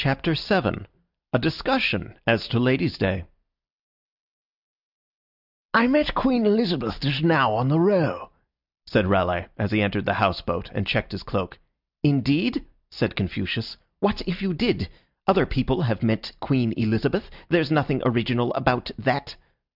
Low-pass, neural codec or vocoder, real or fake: 5.4 kHz; none; real